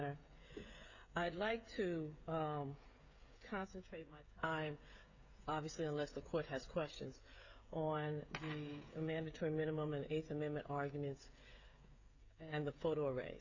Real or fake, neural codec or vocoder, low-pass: fake; codec, 16 kHz, 16 kbps, FreqCodec, smaller model; 7.2 kHz